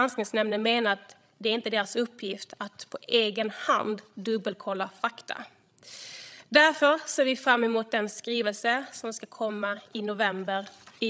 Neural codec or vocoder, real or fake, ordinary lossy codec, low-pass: codec, 16 kHz, 16 kbps, FreqCodec, larger model; fake; none; none